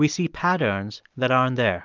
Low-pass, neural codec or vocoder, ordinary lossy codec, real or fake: 7.2 kHz; none; Opus, 24 kbps; real